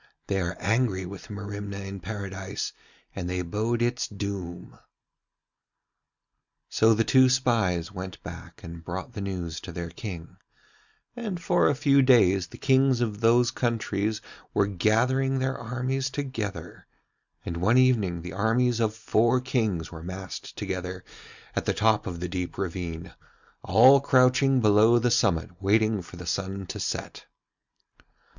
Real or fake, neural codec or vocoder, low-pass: real; none; 7.2 kHz